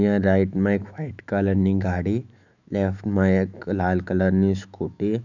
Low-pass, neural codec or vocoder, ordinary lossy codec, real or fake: 7.2 kHz; autoencoder, 48 kHz, 128 numbers a frame, DAC-VAE, trained on Japanese speech; none; fake